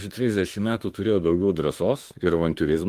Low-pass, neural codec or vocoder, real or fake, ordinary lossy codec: 14.4 kHz; autoencoder, 48 kHz, 32 numbers a frame, DAC-VAE, trained on Japanese speech; fake; Opus, 24 kbps